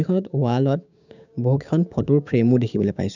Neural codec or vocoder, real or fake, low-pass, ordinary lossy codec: codec, 16 kHz, 6 kbps, DAC; fake; 7.2 kHz; none